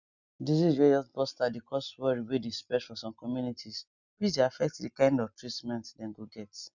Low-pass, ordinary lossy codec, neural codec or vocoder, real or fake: 7.2 kHz; none; none; real